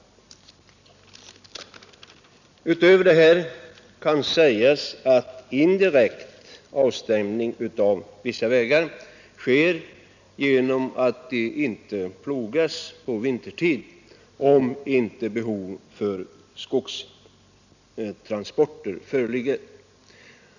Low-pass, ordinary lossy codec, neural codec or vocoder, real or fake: 7.2 kHz; none; none; real